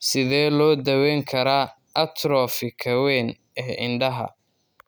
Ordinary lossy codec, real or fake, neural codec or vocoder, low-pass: none; real; none; none